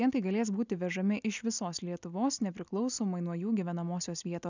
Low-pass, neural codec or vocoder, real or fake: 7.2 kHz; none; real